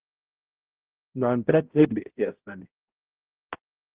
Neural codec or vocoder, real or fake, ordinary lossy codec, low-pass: codec, 16 kHz, 0.5 kbps, X-Codec, HuBERT features, trained on balanced general audio; fake; Opus, 16 kbps; 3.6 kHz